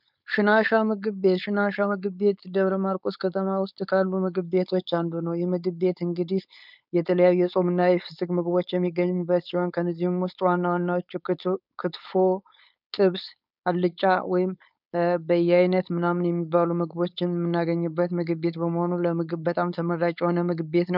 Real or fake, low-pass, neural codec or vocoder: fake; 5.4 kHz; codec, 16 kHz, 4.8 kbps, FACodec